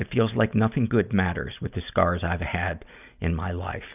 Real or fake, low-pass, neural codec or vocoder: real; 3.6 kHz; none